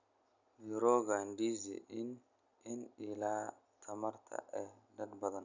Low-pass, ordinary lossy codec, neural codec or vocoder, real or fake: 7.2 kHz; none; none; real